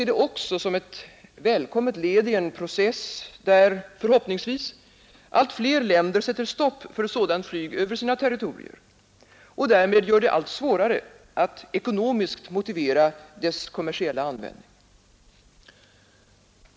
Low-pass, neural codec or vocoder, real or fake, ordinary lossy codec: none; none; real; none